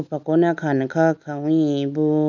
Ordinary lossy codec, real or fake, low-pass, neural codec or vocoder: none; real; 7.2 kHz; none